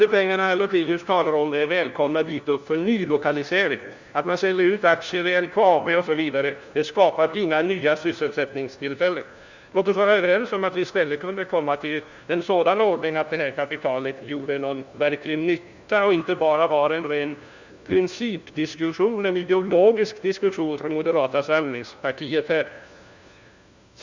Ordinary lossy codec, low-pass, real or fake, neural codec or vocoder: Opus, 64 kbps; 7.2 kHz; fake; codec, 16 kHz, 1 kbps, FunCodec, trained on LibriTTS, 50 frames a second